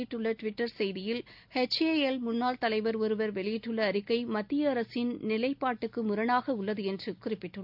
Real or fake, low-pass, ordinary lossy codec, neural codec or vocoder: real; 5.4 kHz; none; none